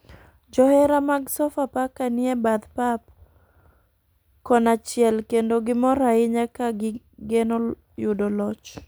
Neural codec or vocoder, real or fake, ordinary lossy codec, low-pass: none; real; none; none